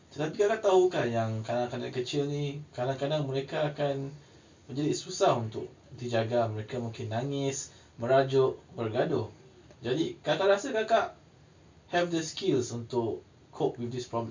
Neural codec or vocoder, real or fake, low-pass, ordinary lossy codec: none; real; 7.2 kHz; MP3, 64 kbps